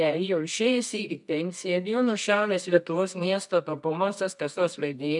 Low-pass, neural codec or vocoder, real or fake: 10.8 kHz; codec, 24 kHz, 0.9 kbps, WavTokenizer, medium music audio release; fake